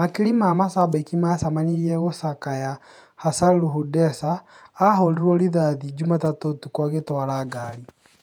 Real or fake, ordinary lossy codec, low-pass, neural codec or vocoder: fake; none; 19.8 kHz; vocoder, 48 kHz, 128 mel bands, Vocos